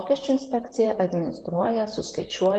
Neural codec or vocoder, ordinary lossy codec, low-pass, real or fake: vocoder, 44.1 kHz, 128 mel bands, Pupu-Vocoder; Opus, 64 kbps; 10.8 kHz; fake